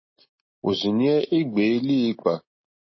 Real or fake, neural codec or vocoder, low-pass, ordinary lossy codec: real; none; 7.2 kHz; MP3, 24 kbps